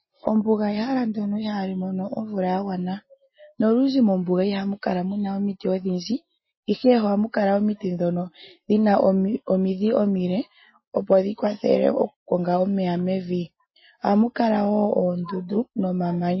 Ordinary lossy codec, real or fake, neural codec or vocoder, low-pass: MP3, 24 kbps; real; none; 7.2 kHz